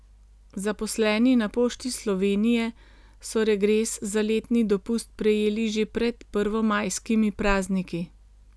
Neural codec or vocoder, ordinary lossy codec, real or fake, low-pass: none; none; real; none